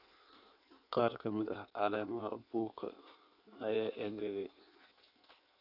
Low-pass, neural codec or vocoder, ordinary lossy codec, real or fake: 5.4 kHz; codec, 16 kHz in and 24 kHz out, 1.1 kbps, FireRedTTS-2 codec; none; fake